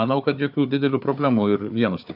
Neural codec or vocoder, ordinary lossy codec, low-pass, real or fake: codec, 16 kHz, 4 kbps, FunCodec, trained on Chinese and English, 50 frames a second; AAC, 48 kbps; 5.4 kHz; fake